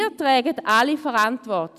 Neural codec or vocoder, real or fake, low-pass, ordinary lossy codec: none; real; 14.4 kHz; none